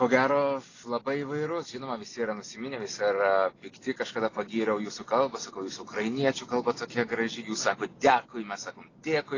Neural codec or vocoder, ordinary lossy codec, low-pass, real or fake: none; AAC, 32 kbps; 7.2 kHz; real